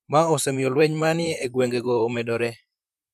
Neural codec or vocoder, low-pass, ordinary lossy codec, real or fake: vocoder, 44.1 kHz, 128 mel bands, Pupu-Vocoder; 14.4 kHz; none; fake